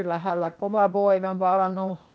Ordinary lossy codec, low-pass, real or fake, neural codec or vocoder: none; none; fake; codec, 16 kHz, 0.8 kbps, ZipCodec